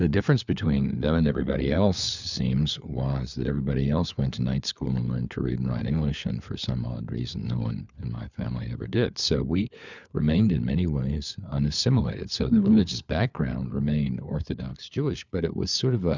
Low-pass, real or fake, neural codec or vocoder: 7.2 kHz; fake; codec, 16 kHz, 4 kbps, FunCodec, trained on LibriTTS, 50 frames a second